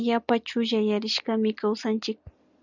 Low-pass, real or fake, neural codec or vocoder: 7.2 kHz; real; none